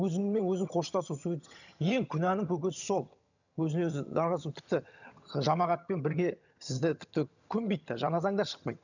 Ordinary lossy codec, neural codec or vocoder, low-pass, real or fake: none; vocoder, 22.05 kHz, 80 mel bands, HiFi-GAN; 7.2 kHz; fake